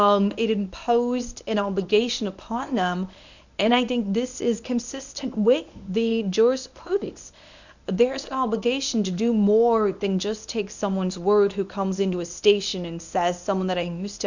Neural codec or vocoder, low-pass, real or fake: codec, 24 kHz, 0.9 kbps, WavTokenizer, medium speech release version 1; 7.2 kHz; fake